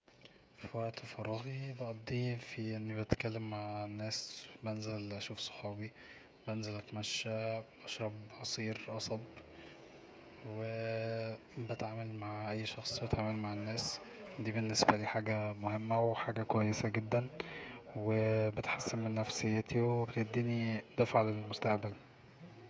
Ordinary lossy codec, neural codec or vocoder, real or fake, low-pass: none; codec, 16 kHz, 16 kbps, FreqCodec, smaller model; fake; none